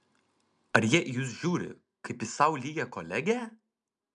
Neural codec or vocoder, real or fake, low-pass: none; real; 10.8 kHz